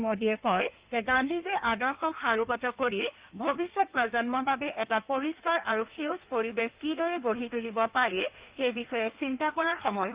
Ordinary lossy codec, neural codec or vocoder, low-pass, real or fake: Opus, 32 kbps; codec, 16 kHz in and 24 kHz out, 1.1 kbps, FireRedTTS-2 codec; 3.6 kHz; fake